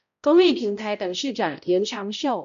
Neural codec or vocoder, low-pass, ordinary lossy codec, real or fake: codec, 16 kHz, 0.5 kbps, X-Codec, HuBERT features, trained on balanced general audio; 7.2 kHz; MP3, 64 kbps; fake